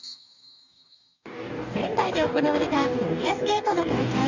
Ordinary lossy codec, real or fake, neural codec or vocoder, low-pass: none; fake; codec, 44.1 kHz, 2.6 kbps, DAC; 7.2 kHz